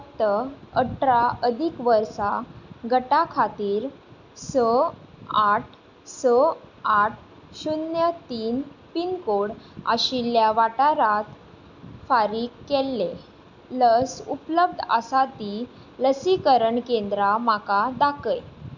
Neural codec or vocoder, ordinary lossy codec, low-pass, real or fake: none; none; 7.2 kHz; real